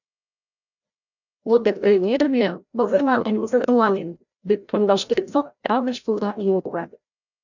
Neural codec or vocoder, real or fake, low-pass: codec, 16 kHz, 0.5 kbps, FreqCodec, larger model; fake; 7.2 kHz